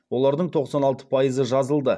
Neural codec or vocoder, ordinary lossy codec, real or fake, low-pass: none; none; real; none